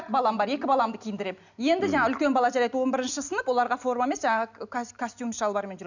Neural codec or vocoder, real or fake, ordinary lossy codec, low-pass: none; real; none; 7.2 kHz